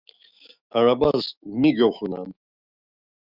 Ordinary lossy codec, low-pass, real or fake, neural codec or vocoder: Opus, 64 kbps; 5.4 kHz; fake; autoencoder, 48 kHz, 128 numbers a frame, DAC-VAE, trained on Japanese speech